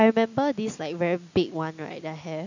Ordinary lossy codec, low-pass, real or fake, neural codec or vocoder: none; 7.2 kHz; real; none